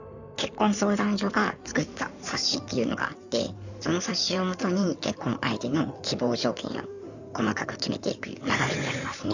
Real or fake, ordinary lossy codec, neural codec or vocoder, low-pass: fake; none; codec, 16 kHz in and 24 kHz out, 2.2 kbps, FireRedTTS-2 codec; 7.2 kHz